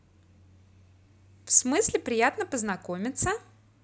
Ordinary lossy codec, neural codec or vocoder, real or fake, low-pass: none; none; real; none